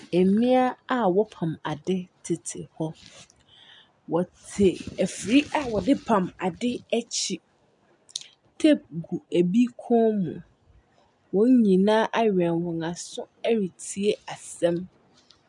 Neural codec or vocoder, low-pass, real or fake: none; 10.8 kHz; real